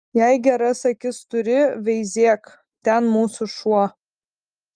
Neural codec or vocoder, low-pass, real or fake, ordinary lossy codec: none; 9.9 kHz; real; Opus, 32 kbps